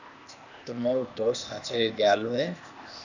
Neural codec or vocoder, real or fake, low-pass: codec, 16 kHz, 0.8 kbps, ZipCodec; fake; 7.2 kHz